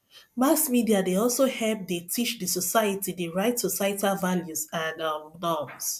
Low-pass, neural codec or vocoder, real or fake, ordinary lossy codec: 14.4 kHz; none; real; MP3, 96 kbps